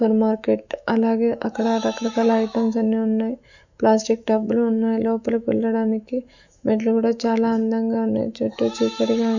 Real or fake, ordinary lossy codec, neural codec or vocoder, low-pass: fake; none; autoencoder, 48 kHz, 128 numbers a frame, DAC-VAE, trained on Japanese speech; 7.2 kHz